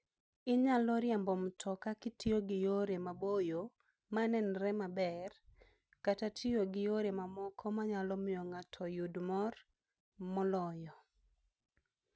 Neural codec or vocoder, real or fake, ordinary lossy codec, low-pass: none; real; none; none